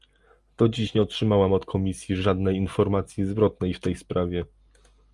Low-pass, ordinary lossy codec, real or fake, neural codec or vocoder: 10.8 kHz; Opus, 32 kbps; real; none